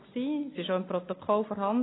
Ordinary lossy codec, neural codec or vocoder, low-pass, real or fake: AAC, 16 kbps; none; 7.2 kHz; real